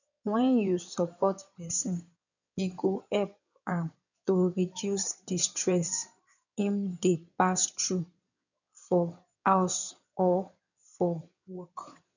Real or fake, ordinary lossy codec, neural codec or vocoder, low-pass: fake; none; codec, 16 kHz in and 24 kHz out, 2.2 kbps, FireRedTTS-2 codec; 7.2 kHz